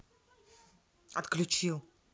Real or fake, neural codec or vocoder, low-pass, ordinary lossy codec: real; none; none; none